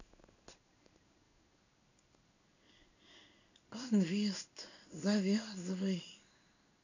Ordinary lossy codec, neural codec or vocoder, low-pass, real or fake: none; codec, 16 kHz in and 24 kHz out, 1 kbps, XY-Tokenizer; 7.2 kHz; fake